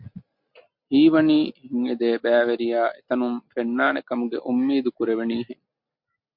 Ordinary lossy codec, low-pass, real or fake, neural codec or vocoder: MP3, 48 kbps; 5.4 kHz; real; none